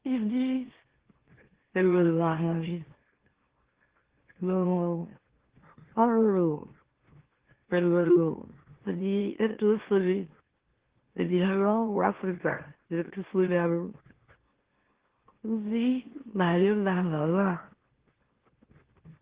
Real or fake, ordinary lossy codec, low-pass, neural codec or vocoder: fake; Opus, 16 kbps; 3.6 kHz; autoencoder, 44.1 kHz, a latent of 192 numbers a frame, MeloTTS